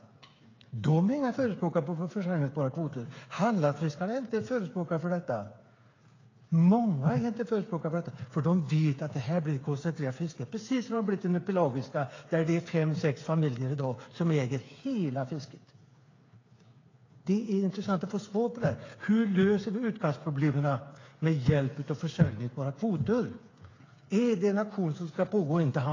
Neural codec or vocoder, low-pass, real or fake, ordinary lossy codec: codec, 16 kHz, 8 kbps, FreqCodec, smaller model; 7.2 kHz; fake; AAC, 32 kbps